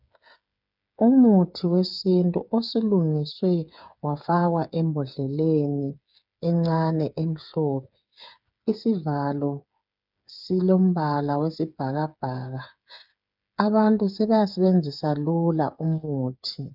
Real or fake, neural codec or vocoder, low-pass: fake; codec, 16 kHz, 8 kbps, FreqCodec, smaller model; 5.4 kHz